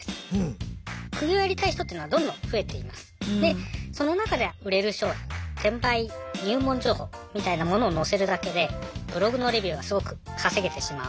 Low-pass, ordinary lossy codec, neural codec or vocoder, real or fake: none; none; none; real